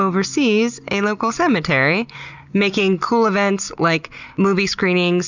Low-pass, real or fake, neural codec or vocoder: 7.2 kHz; real; none